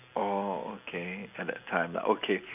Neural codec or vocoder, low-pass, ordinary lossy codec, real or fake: none; 3.6 kHz; none; real